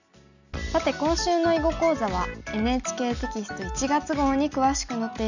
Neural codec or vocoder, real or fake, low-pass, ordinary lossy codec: none; real; 7.2 kHz; none